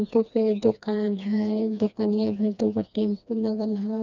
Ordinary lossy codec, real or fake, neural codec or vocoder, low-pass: none; fake; codec, 16 kHz, 2 kbps, FreqCodec, smaller model; 7.2 kHz